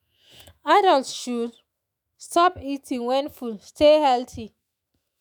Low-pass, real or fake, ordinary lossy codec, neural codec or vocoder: none; fake; none; autoencoder, 48 kHz, 128 numbers a frame, DAC-VAE, trained on Japanese speech